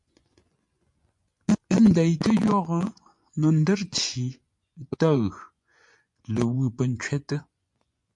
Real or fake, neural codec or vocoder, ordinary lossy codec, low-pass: fake; vocoder, 24 kHz, 100 mel bands, Vocos; MP3, 64 kbps; 10.8 kHz